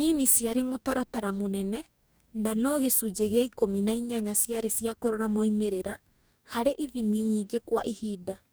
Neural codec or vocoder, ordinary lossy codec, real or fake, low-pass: codec, 44.1 kHz, 2.6 kbps, DAC; none; fake; none